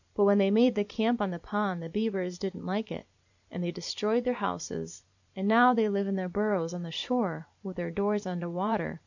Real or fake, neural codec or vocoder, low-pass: fake; vocoder, 44.1 kHz, 128 mel bands every 512 samples, BigVGAN v2; 7.2 kHz